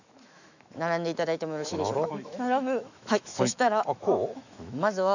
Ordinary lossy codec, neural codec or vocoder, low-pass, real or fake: none; autoencoder, 48 kHz, 128 numbers a frame, DAC-VAE, trained on Japanese speech; 7.2 kHz; fake